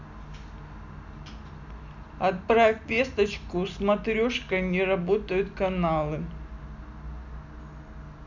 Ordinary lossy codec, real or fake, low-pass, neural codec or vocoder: none; real; 7.2 kHz; none